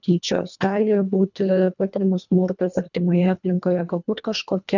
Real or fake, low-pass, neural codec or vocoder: fake; 7.2 kHz; codec, 24 kHz, 1.5 kbps, HILCodec